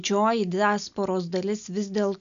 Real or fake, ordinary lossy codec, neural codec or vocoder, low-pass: real; MP3, 96 kbps; none; 7.2 kHz